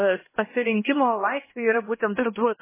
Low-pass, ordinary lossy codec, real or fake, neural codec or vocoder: 3.6 kHz; MP3, 16 kbps; fake; codec, 16 kHz, 1 kbps, X-Codec, HuBERT features, trained on LibriSpeech